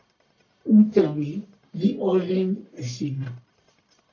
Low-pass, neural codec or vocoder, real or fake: 7.2 kHz; codec, 44.1 kHz, 1.7 kbps, Pupu-Codec; fake